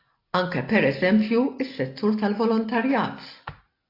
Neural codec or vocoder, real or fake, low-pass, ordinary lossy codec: none; real; 5.4 kHz; AAC, 32 kbps